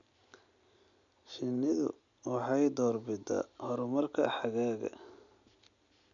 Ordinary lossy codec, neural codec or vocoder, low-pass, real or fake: none; none; 7.2 kHz; real